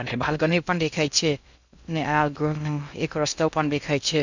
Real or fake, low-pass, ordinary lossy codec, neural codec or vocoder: fake; 7.2 kHz; none; codec, 16 kHz in and 24 kHz out, 0.6 kbps, FocalCodec, streaming, 2048 codes